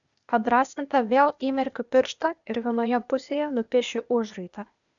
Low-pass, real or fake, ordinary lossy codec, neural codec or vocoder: 7.2 kHz; fake; MP3, 96 kbps; codec, 16 kHz, 0.8 kbps, ZipCodec